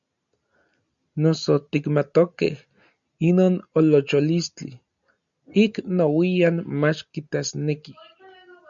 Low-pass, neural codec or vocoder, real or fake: 7.2 kHz; none; real